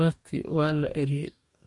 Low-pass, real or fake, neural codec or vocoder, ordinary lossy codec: 10.8 kHz; fake; codec, 44.1 kHz, 2.6 kbps, DAC; MP3, 48 kbps